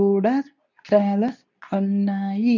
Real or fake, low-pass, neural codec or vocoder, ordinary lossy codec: fake; 7.2 kHz; codec, 24 kHz, 0.9 kbps, WavTokenizer, medium speech release version 2; none